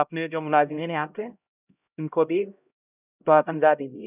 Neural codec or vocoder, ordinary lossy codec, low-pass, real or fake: codec, 16 kHz, 0.5 kbps, X-Codec, HuBERT features, trained on LibriSpeech; none; 3.6 kHz; fake